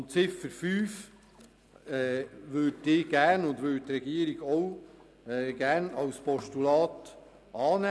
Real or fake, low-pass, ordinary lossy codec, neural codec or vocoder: real; none; none; none